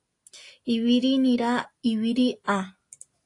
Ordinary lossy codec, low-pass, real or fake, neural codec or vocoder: AAC, 48 kbps; 10.8 kHz; real; none